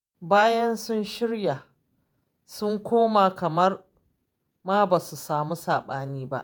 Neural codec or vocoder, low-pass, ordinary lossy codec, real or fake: vocoder, 48 kHz, 128 mel bands, Vocos; none; none; fake